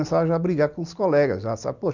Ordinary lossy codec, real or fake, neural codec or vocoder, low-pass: none; real; none; 7.2 kHz